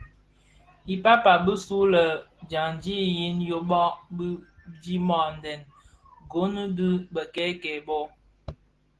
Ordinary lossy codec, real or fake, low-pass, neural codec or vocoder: Opus, 16 kbps; real; 10.8 kHz; none